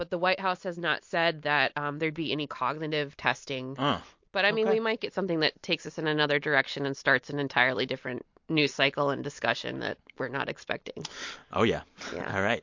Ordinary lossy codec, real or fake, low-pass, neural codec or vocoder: MP3, 48 kbps; real; 7.2 kHz; none